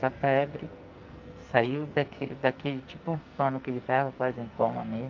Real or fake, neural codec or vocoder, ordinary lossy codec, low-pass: fake; codec, 44.1 kHz, 2.6 kbps, SNAC; Opus, 24 kbps; 7.2 kHz